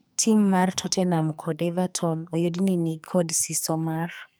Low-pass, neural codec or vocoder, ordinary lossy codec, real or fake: none; codec, 44.1 kHz, 2.6 kbps, SNAC; none; fake